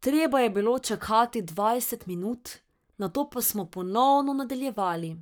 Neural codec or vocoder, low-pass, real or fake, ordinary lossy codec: codec, 44.1 kHz, 7.8 kbps, Pupu-Codec; none; fake; none